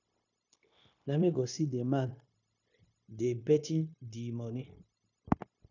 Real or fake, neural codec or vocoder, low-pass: fake; codec, 16 kHz, 0.9 kbps, LongCat-Audio-Codec; 7.2 kHz